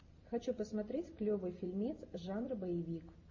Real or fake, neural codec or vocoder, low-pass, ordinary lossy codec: real; none; 7.2 kHz; MP3, 32 kbps